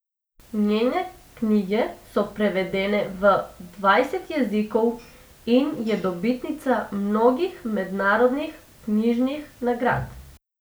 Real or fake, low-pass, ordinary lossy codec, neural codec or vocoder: real; none; none; none